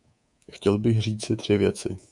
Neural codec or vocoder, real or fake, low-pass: codec, 24 kHz, 3.1 kbps, DualCodec; fake; 10.8 kHz